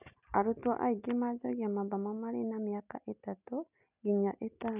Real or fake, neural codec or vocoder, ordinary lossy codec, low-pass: real; none; none; 3.6 kHz